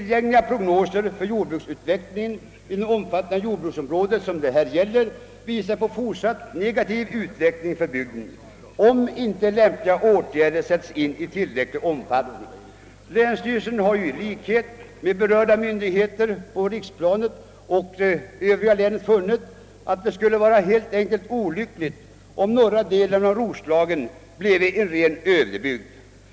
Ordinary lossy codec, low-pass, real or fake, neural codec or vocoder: none; none; real; none